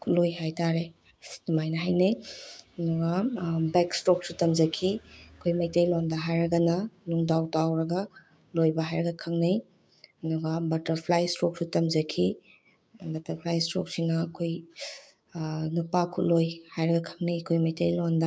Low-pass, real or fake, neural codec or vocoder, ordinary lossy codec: none; fake; codec, 16 kHz, 6 kbps, DAC; none